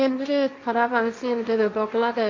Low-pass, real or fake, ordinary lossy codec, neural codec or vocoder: 7.2 kHz; fake; MP3, 64 kbps; codec, 16 kHz, 1.1 kbps, Voila-Tokenizer